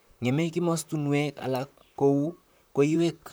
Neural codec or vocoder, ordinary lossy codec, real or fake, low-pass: vocoder, 44.1 kHz, 128 mel bands, Pupu-Vocoder; none; fake; none